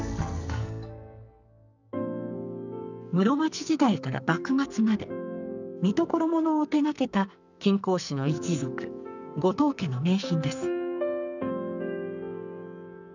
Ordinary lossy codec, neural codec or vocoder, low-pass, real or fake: none; codec, 44.1 kHz, 2.6 kbps, SNAC; 7.2 kHz; fake